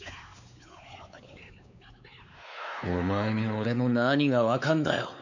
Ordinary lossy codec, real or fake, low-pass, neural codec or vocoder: none; fake; 7.2 kHz; codec, 16 kHz, 4 kbps, X-Codec, WavLM features, trained on Multilingual LibriSpeech